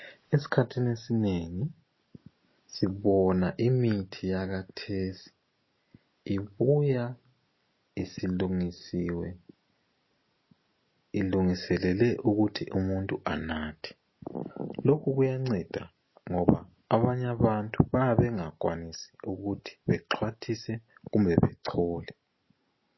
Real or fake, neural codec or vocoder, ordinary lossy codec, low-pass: real; none; MP3, 24 kbps; 7.2 kHz